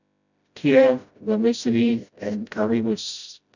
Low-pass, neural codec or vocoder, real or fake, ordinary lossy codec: 7.2 kHz; codec, 16 kHz, 0.5 kbps, FreqCodec, smaller model; fake; none